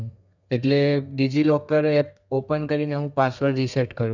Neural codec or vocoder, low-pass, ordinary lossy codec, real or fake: codec, 32 kHz, 1.9 kbps, SNAC; 7.2 kHz; none; fake